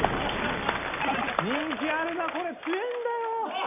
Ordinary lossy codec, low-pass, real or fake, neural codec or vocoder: none; 3.6 kHz; real; none